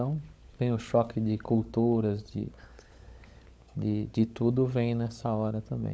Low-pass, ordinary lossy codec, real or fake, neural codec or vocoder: none; none; fake; codec, 16 kHz, 16 kbps, FunCodec, trained on LibriTTS, 50 frames a second